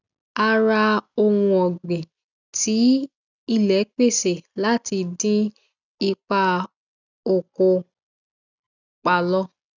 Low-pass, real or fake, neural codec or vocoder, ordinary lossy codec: 7.2 kHz; real; none; none